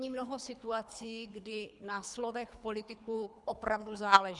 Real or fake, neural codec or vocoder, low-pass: fake; codec, 24 kHz, 3 kbps, HILCodec; 10.8 kHz